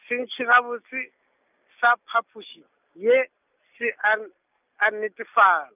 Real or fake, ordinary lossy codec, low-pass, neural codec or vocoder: real; none; 3.6 kHz; none